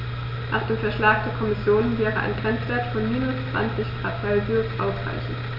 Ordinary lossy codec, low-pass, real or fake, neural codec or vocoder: none; 5.4 kHz; real; none